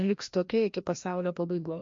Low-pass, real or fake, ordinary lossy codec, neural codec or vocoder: 7.2 kHz; fake; MP3, 48 kbps; codec, 16 kHz, 1 kbps, FreqCodec, larger model